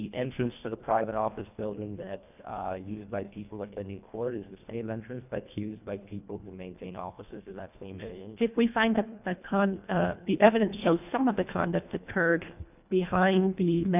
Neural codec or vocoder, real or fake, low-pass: codec, 24 kHz, 1.5 kbps, HILCodec; fake; 3.6 kHz